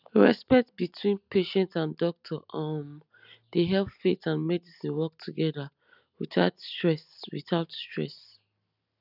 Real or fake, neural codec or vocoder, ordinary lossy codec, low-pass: real; none; none; 5.4 kHz